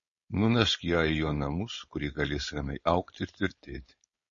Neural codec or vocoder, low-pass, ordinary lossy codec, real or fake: codec, 16 kHz, 4.8 kbps, FACodec; 7.2 kHz; MP3, 32 kbps; fake